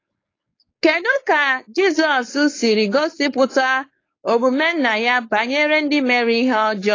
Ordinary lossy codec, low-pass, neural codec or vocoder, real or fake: AAC, 32 kbps; 7.2 kHz; codec, 16 kHz, 4.8 kbps, FACodec; fake